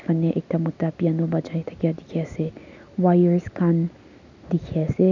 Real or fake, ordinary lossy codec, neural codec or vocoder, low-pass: real; none; none; 7.2 kHz